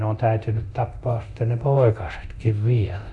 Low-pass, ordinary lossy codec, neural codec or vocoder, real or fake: 10.8 kHz; none; codec, 24 kHz, 0.9 kbps, DualCodec; fake